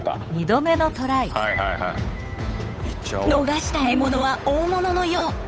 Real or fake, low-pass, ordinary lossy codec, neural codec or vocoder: fake; none; none; codec, 16 kHz, 8 kbps, FunCodec, trained on Chinese and English, 25 frames a second